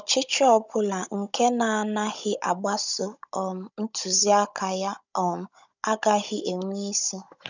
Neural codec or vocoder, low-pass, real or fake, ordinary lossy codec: codec, 16 kHz, 16 kbps, FunCodec, trained on Chinese and English, 50 frames a second; 7.2 kHz; fake; none